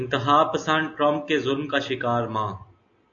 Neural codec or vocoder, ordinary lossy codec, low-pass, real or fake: none; AAC, 64 kbps; 7.2 kHz; real